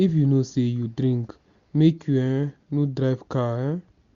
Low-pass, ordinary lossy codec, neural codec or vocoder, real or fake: 7.2 kHz; Opus, 64 kbps; none; real